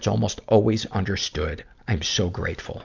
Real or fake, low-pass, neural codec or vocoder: real; 7.2 kHz; none